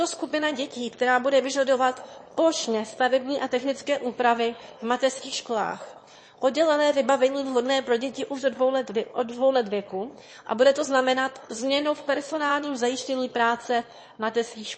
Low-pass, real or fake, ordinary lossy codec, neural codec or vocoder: 9.9 kHz; fake; MP3, 32 kbps; autoencoder, 22.05 kHz, a latent of 192 numbers a frame, VITS, trained on one speaker